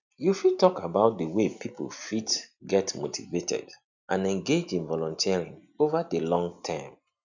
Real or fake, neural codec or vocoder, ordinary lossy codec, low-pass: real; none; none; 7.2 kHz